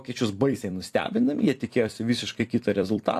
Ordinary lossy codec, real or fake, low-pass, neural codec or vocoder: AAC, 64 kbps; fake; 14.4 kHz; vocoder, 44.1 kHz, 128 mel bands every 256 samples, BigVGAN v2